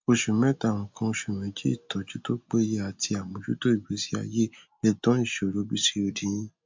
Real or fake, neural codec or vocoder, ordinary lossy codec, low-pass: real; none; MP3, 64 kbps; 7.2 kHz